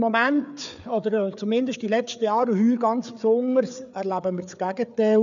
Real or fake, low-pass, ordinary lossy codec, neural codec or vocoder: fake; 7.2 kHz; none; codec, 16 kHz, 4 kbps, FreqCodec, larger model